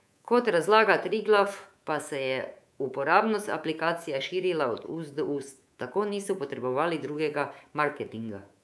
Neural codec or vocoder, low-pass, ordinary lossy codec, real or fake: codec, 24 kHz, 3.1 kbps, DualCodec; none; none; fake